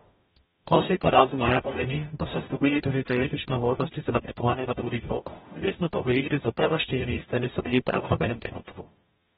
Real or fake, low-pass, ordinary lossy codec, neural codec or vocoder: fake; 19.8 kHz; AAC, 16 kbps; codec, 44.1 kHz, 0.9 kbps, DAC